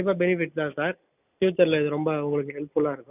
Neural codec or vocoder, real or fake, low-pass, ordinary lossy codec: none; real; 3.6 kHz; none